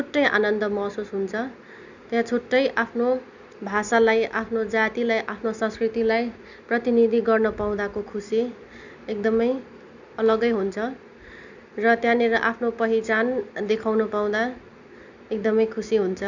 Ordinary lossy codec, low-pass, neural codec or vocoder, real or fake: none; 7.2 kHz; none; real